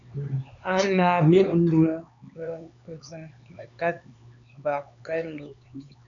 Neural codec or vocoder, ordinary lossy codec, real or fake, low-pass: codec, 16 kHz, 4 kbps, X-Codec, HuBERT features, trained on LibriSpeech; MP3, 96 kbps; fake; 7.2 kHz